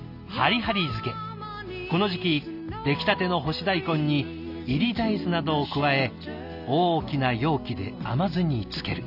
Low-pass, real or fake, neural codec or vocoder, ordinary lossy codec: 5.4 kHz; real; none; none